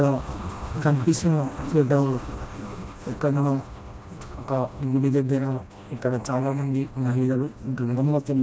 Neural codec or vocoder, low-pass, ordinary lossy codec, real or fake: codec, 16 kHz, 1 kbps, FreqCodec, smaller model; none; none; fake